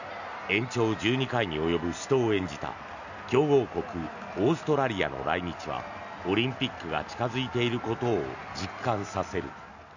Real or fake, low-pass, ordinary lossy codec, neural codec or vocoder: real; 7.2 kHz; none; none